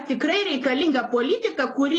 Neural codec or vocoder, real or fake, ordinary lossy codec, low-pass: vocoder, 44.1 kHz, 128 mel bands every 512 samples, BigVGAN v2; fake; AAC, 32 kbps; 10.8 kHz